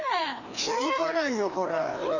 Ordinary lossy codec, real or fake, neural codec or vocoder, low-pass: none; fake; codec, 16 kHz, 2 kbps, FreqCodec, larger model; 7.2 kHz